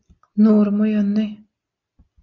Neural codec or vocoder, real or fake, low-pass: none; real; 7.2 kHz